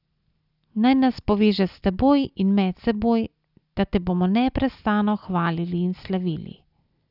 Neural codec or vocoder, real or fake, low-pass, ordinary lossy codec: none; real; 5.4 kHz; none